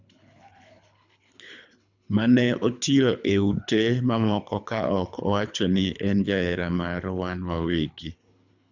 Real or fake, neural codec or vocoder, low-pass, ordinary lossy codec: fake; codec, 24 kHz, 3 kbps, HILCodec; 7.2 kHz; none